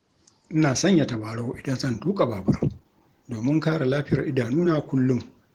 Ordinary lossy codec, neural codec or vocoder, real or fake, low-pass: Opus, 16 kbps; vocoder, 44.1 kHz, 128 mel bands every 512 samples, BigVGAN v2; fake; 19.8 kHz